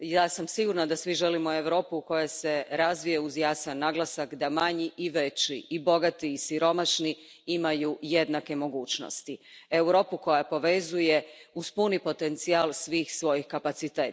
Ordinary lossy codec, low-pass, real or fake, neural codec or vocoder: none; none; real; none